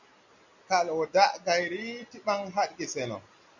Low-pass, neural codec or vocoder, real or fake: 7.2 kHz; none; real